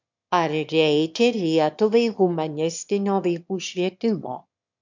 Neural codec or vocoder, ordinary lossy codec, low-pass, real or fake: autoencoder, 22.05 kHz, a latent of 192 numbers a frame, VITS, trained on one speaker; MP3, 64 kbps; 7.2 kHz; fake